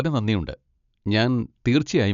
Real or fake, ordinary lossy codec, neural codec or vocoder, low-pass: real; none; none; 7.2 kHz